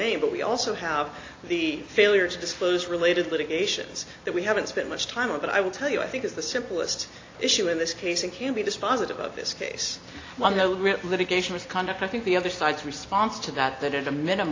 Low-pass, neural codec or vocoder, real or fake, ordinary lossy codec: 7.2 kHz; none; real; AAC, 32 kbps